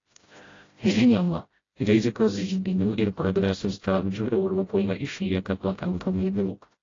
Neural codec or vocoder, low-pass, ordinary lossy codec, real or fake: codec, 16 kHz, 0.5 kbps, FreqCodec, smaller model; 7.2 kHz; AAC, 32 kbps; fake